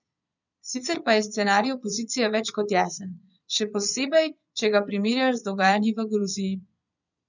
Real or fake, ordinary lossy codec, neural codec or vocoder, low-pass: fake; none; vocoder, 22.05 kHz, 80 mel bands, Vocos; 7.2 kHz